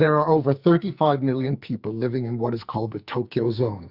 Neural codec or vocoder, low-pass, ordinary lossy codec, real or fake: codec, 44.1 kHz, 2.6 kbps, SNAC; 5.4 kHz; Opus, 64 kbps; fake